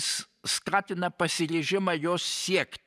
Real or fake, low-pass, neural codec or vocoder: fake; 14.4 kHz; vocoder, 44.1 kHz, 128 mel bands every 512 samples, BigVGAN v2